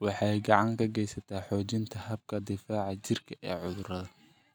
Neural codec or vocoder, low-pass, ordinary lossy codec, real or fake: none; none; none; real